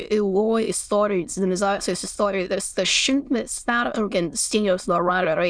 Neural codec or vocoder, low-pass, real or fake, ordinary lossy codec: autoencoder, 22.05 kHz, a latent of 192 numbers a frame, VITS, trained on many speakers; 9.9 kHz; fake; Opus, 64 kbps